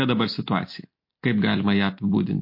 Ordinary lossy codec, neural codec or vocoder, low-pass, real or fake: MP3, 32 kbps; none; 5.4 kHz; real